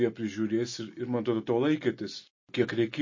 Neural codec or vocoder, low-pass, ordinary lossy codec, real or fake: none; 7.2 kHz; MP3, 32 kbps; real